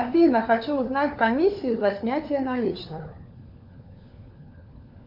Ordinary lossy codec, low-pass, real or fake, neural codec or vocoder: MP3, 48 kbps; 5.4 kHz; fake; codec, 16 kHz, 4 kbps, FunCodec, trained on LibriTTS, 50 frames a second